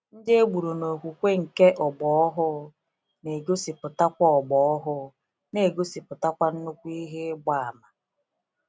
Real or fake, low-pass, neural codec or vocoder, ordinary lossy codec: real; none; none; none